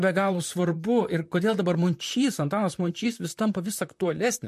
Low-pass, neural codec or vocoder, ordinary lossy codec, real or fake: 14.4 kHz; vocoder, 44.1 kHz, 128 mel bands, Pupu-Vocoder; MP3, 64 kbps; fake